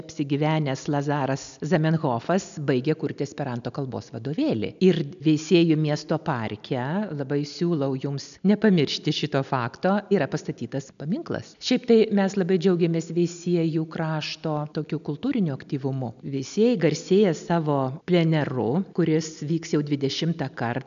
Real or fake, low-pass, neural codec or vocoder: real; 7.2 kHz; none